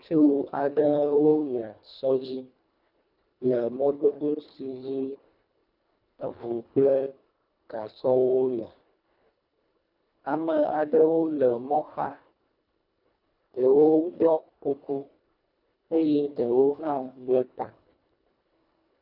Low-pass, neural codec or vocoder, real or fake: 5.4 kHz; codec, 24 kHz, 1.5 kbps, HILCodec; fake